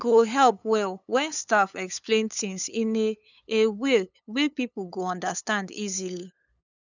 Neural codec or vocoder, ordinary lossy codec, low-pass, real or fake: codec, 16 kHz, 8 kbps, FunCodec, trained on LibriTTS, 25 frames a second; none; 7.2 kHz; fake